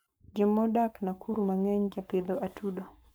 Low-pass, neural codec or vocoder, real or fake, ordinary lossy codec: none; codec, 44.1 kHz, 7.8 kbps, Pupu-Codec; fake; none